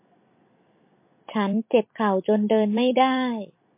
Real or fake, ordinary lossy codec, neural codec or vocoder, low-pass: fake; MP3, 24 kbps; vocoder, 44.1 kHz, 128 mel bands every 256 samples, BigVGAN v2; 3.6 kHz